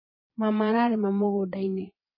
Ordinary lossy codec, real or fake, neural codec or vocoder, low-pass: MP3, 24 kbps; fake; vocoder, 22.05 kHz, 80 mel bands, Vocos; 5.4 kHz